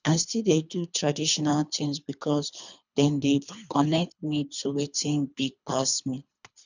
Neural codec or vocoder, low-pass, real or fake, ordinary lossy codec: codec, 24 kHz, 3 kbps, HILCodec; 7.2 kHz; fake; none